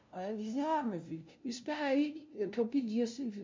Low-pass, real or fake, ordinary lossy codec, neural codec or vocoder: 7.2 kHz; fake; MP3, 48 kbps; codec, 16 kHz, 0.5 kbps, FunCodec, trained on LibriTTS, 25 frames a second